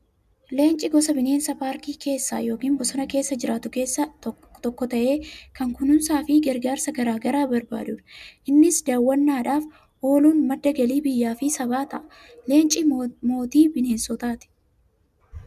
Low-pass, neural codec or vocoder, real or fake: 14.4 kHz; none; real